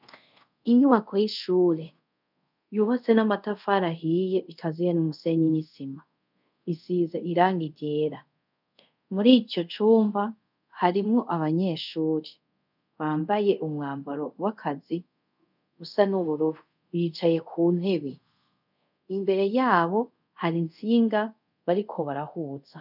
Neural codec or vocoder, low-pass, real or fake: codec, 24 kHz, 0.5 kbps, DualCodec; 5.4 kHz; fake